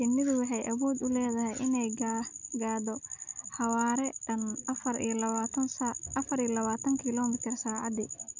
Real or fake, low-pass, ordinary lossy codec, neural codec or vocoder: real; 7.2 kHz; none; none